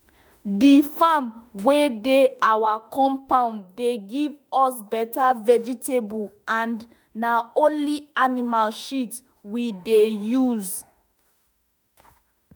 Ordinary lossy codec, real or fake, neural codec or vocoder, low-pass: none; fake; autoencoder, 48 kHz, 32 numbers a frame, DAC-VAE, trained on Japanese speech; none